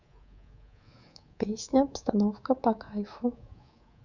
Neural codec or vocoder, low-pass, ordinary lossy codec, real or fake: codec, 24 kHz, 3.1 kbps, DualCodec; 7.2 kHz; none; fake